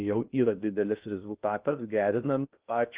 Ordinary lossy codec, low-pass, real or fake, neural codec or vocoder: Opus, 32 kbps; 3.6 kHz; fake; codec, 16 kHz in and 24 kHz out, 0.6 kbps, FocalCodec, streaming, 2048 codes